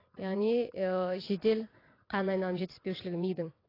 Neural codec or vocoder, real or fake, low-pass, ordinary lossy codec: vocoder, 22.05 kHz, 80 mel bands, WaveNeXt; fake; 5.4 kHz; AAC, 24 kbps